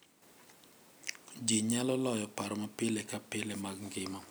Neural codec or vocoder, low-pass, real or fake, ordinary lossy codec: none; none; real; none